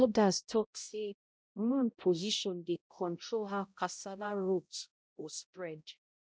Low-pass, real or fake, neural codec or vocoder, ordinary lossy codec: none; fake; codec, 16 kHz, 0.5 kbps, X-Codec, HuBERT features, trained on balanced general audio; none